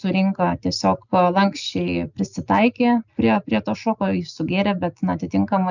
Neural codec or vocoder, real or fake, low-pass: none; real; 7.2 kHz